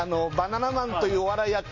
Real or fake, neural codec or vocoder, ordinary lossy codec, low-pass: real; none; MP3, 32 kbps; 7.2 kHz